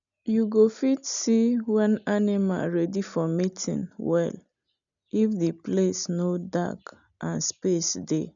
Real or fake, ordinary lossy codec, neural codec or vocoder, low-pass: real; none; none; 7.2 kHz